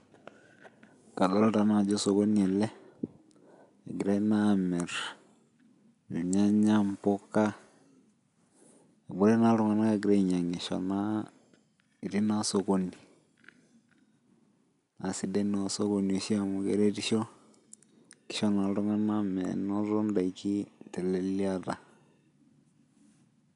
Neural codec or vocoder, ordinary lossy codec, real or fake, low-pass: none; none; real; 10.8 kHz